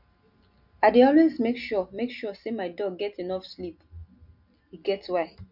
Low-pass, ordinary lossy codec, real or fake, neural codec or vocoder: 5.4 kHz; none; real; none